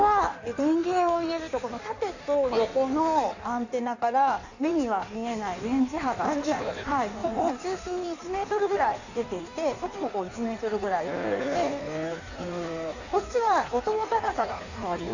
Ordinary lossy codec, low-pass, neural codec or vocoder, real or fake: none; 7.2 kHz; codec, 16 kHz in and 24 kHz out, 1.1 kbps, FireRedTTS-2 codec; fake